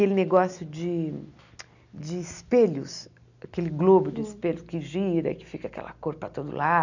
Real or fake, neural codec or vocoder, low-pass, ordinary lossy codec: real; none; 7.2 kHz; none